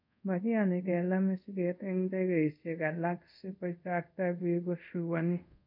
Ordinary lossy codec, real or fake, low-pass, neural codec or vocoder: none; fake; 5.4 kHz; codec, 24 kHz, 0.5 kbps, DualCodec